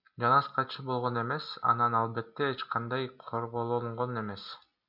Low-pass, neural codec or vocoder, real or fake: 5.4 kHz; none; real